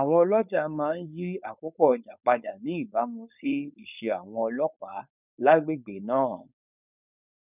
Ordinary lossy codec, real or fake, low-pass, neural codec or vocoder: none; fake; 3.6 kHz; codec, 16 kHz, 4.8 kbps, FACodec